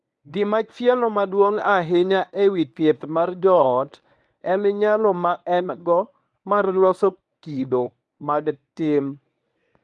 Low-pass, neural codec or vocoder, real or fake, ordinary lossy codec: none; codec, 24 kHz, 0.9 kbps, WavTokenizer, medium speech release version 1; fake; none